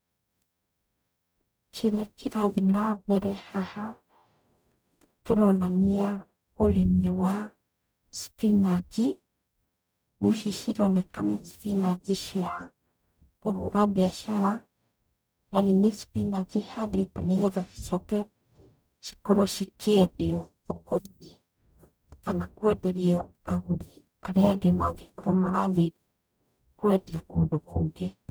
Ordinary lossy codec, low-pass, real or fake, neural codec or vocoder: none; none; fake; codec, 44.1 kHz, 0.9 kbps, DAC